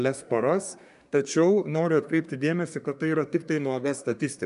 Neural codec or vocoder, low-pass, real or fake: codec, 24 kHz, 1 kbps, SNAC; 10.8 kHz; fake